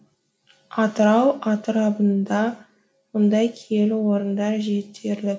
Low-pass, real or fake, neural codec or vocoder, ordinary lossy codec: none; real; none; none